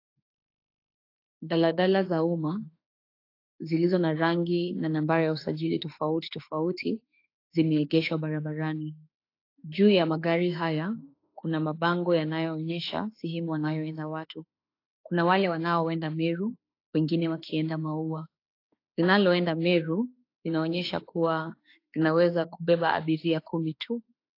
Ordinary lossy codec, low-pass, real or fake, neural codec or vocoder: AAC, 32 kbps; 5.4 kHz; fake; autoencoder, 48 kHz, 32 numbers a frame, DAC-VAE, trained on Japanese speech